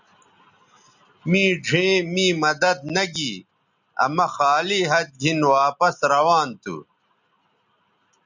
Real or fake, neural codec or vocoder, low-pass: real; none; 7.2 kHz